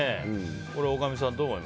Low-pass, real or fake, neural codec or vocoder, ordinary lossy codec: none; real; none; none